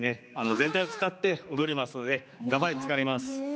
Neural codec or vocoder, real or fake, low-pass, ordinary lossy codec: codec, 16 kHz, 2 kbps, X-Codec, HuBERT features, trained on general audio; fake; none; none